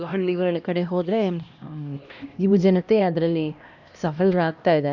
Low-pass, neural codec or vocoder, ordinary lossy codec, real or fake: 7.2 kHz; codec, 16 kHz, 1 kbps, X-Codec, HuBERT features, trained on LibriSpeech; none; fake